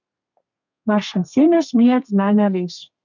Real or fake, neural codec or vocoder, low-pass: fake; codec, 32 kHz, 1.9 kbps, SNAC; 7.2 kHz